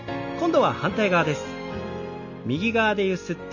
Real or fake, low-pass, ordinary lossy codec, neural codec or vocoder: real; 7.2 kHz; none; none